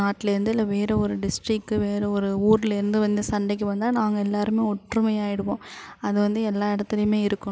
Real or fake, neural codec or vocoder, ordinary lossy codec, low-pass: real; none; none; none